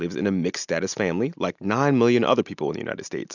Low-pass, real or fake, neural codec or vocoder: 7.2 kHz; real; none